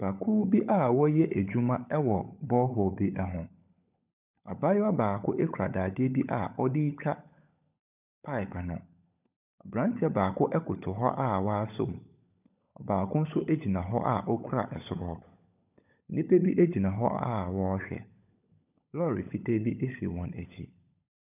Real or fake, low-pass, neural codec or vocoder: fake; 3.6 kHz; codec, 16 kHz, 16 kbps, FunCodec, trained on LibriTTS, 50 frames a second